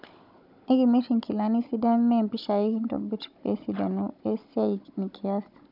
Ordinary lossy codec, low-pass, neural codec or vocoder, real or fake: none; 5.4 kHz; codec, 16 kHz, 16 kbps, FunCodec, trained on Chinese and English, 50 frames a second; fake